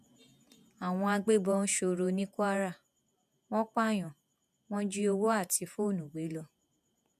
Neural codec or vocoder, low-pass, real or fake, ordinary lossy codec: vocoder, 48 kHz, 128 mel bands, Vocos; 14.4 kHz; fake; none